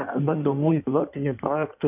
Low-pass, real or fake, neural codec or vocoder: 3.6 kHz; fake; codec, 16 kHz in and 24 kHz out, 1.1 kbps, FireRedTTS-2 codec